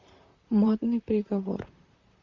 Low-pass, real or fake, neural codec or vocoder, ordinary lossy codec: 7.2 kHz; fake; vocoder, 44.1 kHz, 128 mel bands, Pupu-Vocoder; Opus, 64 kbps